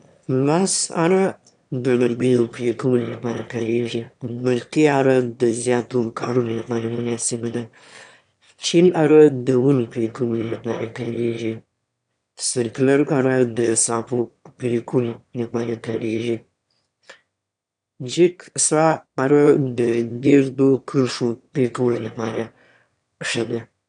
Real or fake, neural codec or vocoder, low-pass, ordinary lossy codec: fake; autoencoder, 22.05 kHz, a latent of 192 numbers a frame, VITS, trained on one speaker; 9.9 kHz; none